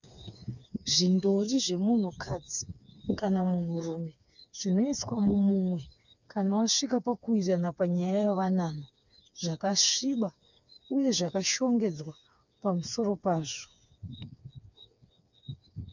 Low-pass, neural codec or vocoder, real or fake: 7.2 kHz; codec, 16 kHz, 4 kbps, FreqCodec, smaller model; fake